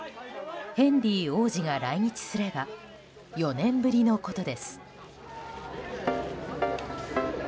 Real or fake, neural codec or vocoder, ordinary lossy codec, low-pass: real; none; none; none